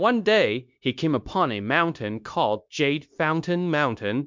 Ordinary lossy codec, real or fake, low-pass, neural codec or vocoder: MP3, 64 kbps; fake; 7.2 kHz; codec, 16 kHz, 0.9 kbps, LongCat-Audio-Codec